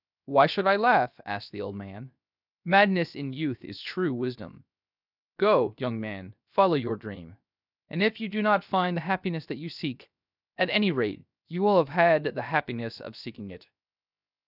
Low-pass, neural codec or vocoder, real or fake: 5.4 kHz; codec, 16 kHz, 0.7 kbps, FocalCodec; fake